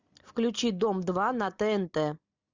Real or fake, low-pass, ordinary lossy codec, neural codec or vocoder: real; 7.2 kHz; Opus, 64 kbps; none